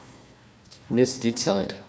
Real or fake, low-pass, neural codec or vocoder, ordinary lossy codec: fake; none; codec, 16 kHz, 1 kbps, FunCodec, trained on Chinese and English, 50 frames a second; none